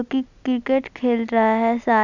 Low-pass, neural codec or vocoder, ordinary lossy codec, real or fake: 7.2 kHz; none; none; real